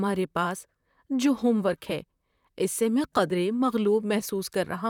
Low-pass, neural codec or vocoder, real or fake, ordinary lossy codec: 19.8 kHz; vocoder, 44.1 kHz, 128 mel bands, Pupu-Vocoder; fake; none